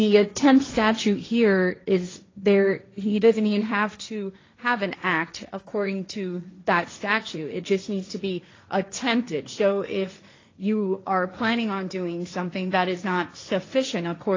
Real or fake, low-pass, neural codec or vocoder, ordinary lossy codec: fake; 7.2 kHz; codec, 16 kHz, 1.1 kbps, Voila-Tokenizer; AAC, 32 kbps